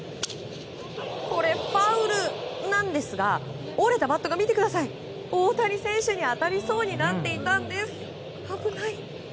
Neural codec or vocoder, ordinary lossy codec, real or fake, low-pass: none; none; real; none